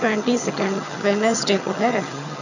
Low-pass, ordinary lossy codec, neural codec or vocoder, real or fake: 7.2 kHz; none; vocoder, 22.05 kHz, 80 mel bands, HiFi-GAN; fake